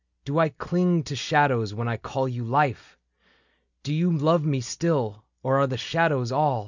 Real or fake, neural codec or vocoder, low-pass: real; none; 7.2 kHz